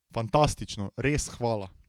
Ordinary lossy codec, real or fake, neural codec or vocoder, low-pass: none; fake; vocoder, 48 kHz, 128 mel bands, Vocos; 19.8 kHz